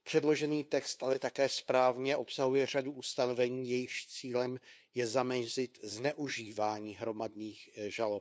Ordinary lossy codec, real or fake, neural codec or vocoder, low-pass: none; fake; codec, 16 kHz, 2 kbps, FunCodec, trained on LibriTTS, 25 frames a second; none